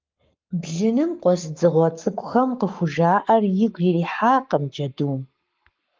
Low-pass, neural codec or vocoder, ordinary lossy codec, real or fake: 7.2 kHz; codec, 44.1 kHz, 7.8 kbps, Pupu-Codec; Opus, 24 kbps; fake